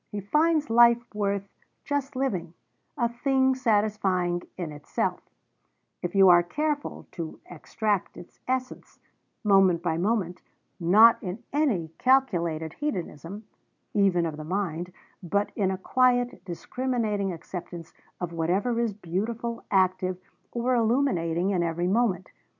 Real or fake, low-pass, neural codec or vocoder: real; 7.2 kHz; none